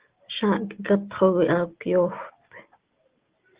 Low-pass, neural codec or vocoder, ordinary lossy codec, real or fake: 3.6 kHz; none; Opus, 16 kbps; real